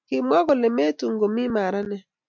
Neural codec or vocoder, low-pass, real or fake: none; 7.2 kHz; real